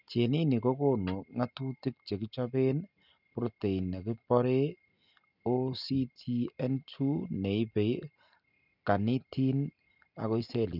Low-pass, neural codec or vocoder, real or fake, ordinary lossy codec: 5.4 kHz; none; real; none